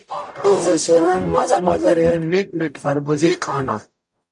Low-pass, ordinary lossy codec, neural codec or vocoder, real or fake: 10.8 kHz; MP3, 64 kbps; codec, 44.1 kHz, 0.9 kbps, DAC; fake